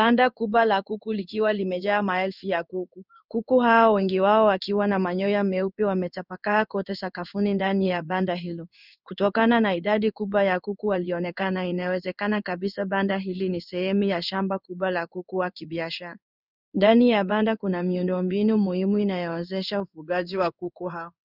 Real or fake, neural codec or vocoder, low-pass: fake; codec, 16 kHz in and 24 kHz out, 1 kbps, XY-Tokenizer; 5.4 kHz